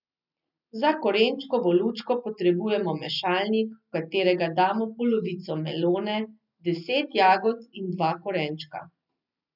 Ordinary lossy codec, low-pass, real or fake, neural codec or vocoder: none; 5.4 kHz; real; none